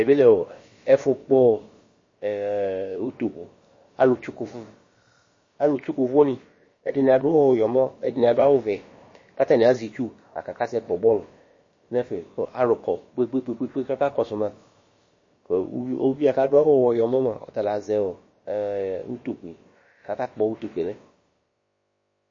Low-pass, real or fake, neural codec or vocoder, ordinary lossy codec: 7.2 kHz; fake; codec, 16 kHz, about 1 kbps, DyCAST, with the encoder's durations; MP3, 32 kbps